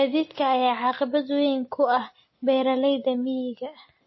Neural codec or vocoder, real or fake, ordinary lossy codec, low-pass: none; real; MP3, 24 kbps; 7.2 kHz